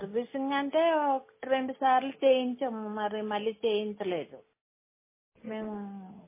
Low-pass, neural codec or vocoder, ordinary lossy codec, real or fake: 3.6 kHz; none; MP3, 16 kbps; real